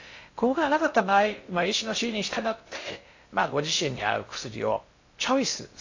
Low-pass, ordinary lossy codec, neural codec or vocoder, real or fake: 7.2 kHz; AAC, 48 kbps; codec, 16 kHz in and 24 kHz out, 0.6 kbps, FocalCodec, streaming, 2048 codes; fake